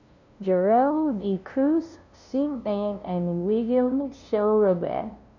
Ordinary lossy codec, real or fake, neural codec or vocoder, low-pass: none; fake; codec, 16 kHz, 0.5 kbps, FunCodec, trained on LibriTTS, 25 frames a second; 7.2 kHz